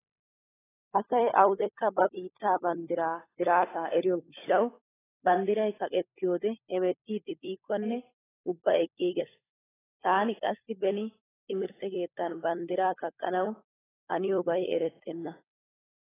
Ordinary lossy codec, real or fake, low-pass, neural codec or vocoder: AAC, 16 kbps; fake; 3.6 kHz; codec, 16 kHz, 16 kbps, FunCodec, trained on LibriTTS, 50 frames a second